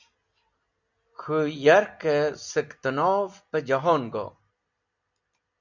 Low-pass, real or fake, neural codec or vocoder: 7.2 kHz; real; none